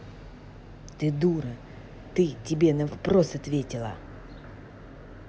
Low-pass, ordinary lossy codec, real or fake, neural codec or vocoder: none; none; real; none